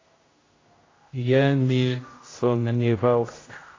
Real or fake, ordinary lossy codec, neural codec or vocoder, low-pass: fake; AAC, 32 kbps; codec, 16 kHz, 0.5 kbps, X-Codec, HuBERT features, trained on general audio; 7.2 kHz